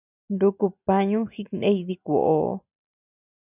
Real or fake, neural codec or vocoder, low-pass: real; none; 3.6 kHz